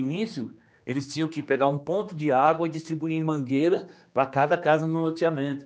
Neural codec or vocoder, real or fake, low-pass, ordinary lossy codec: codec, 16 kHz, 2 kbps, X-Codec, HuBERT features, trained on general audio; fake; none; none